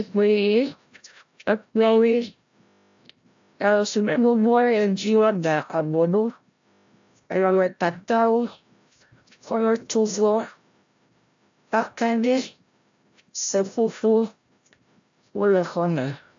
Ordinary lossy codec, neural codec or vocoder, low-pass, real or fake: AAC, 64 kbps; codec, 16 kHz, 0.5 kbps, FreqCodec, larger model; 7.2 kHz; fake